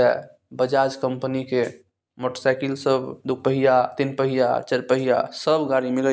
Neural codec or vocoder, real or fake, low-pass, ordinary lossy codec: none; real; none; none